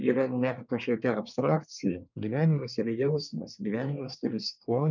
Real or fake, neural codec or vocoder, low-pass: fake; codec, 24 kHz, 1 kbps, SNAC; 7.2 kHz